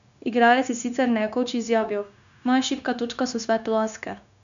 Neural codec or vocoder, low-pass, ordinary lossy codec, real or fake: codec, 16 kHz, 0.9 kbps, LongCat-Audio-Codec; 7.2 kHz; none; fake